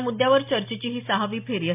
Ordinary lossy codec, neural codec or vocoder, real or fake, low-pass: MP3, 32 kbps; none; real; 3.6 kHz